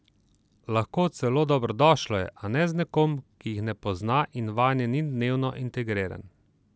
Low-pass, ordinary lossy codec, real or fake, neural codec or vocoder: none; none; real; none